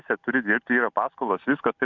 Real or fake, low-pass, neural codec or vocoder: real; 7.2 kHz; none